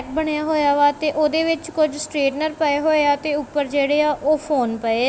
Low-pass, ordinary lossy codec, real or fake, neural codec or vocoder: none; none; real; none